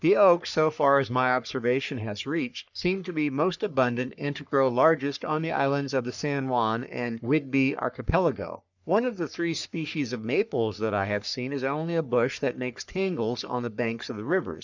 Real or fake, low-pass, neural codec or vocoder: fake; 7.2 kHz; codec, 44.1 kHz, 3.4 kbps, Pupu-Codec